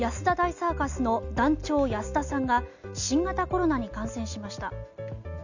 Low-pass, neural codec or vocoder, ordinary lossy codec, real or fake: 7.2 kHz; none; none; real